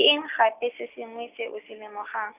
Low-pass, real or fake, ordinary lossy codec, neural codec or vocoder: 3.6 kHz; real; none; none